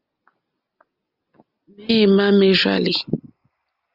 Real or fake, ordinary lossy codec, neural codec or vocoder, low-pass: real; Opus, 32 kbps; none; 5.4 kHz